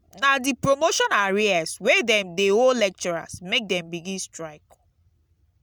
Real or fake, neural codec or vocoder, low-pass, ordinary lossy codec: real; none; none; none